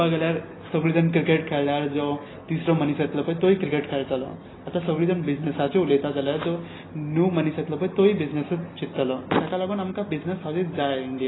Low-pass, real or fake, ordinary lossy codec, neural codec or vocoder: 7.2 kHz; real; AAC, 16 kbps; none